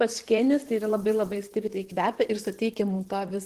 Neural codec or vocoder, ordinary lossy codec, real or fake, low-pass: vocoder, 44.1 kHz, 128 mel bands, Pupu-Vocoder; Opus, 16 kbps; fake; 14.4 kHz